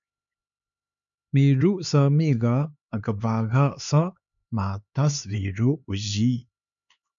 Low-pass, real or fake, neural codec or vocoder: 7.2 kHz; fake; codec, 16 kHz, 4 kbps, X-Codec, HuBERT features, trained on LibriSpeech